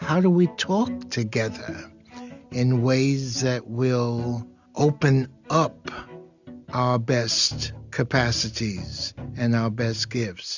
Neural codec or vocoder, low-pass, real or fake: none; 7.2 kHz; real